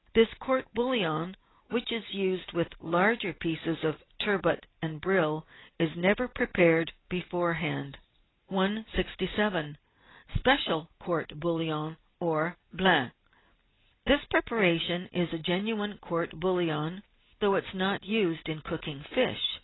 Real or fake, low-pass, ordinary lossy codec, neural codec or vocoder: real; 7.2 kHz; AAC, 16 kbps; none